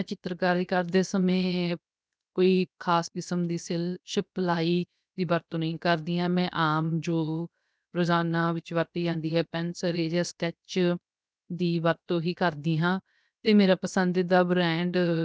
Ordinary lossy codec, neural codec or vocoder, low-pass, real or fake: none; codec, 16 kHz, 0.7 kbps, FocalCodec; none; fake